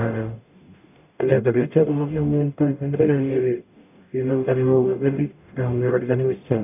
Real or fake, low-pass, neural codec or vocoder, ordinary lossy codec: fake; 3.6 kHz; codec, 44.1 kHz, 0.9 kbps, DAC; none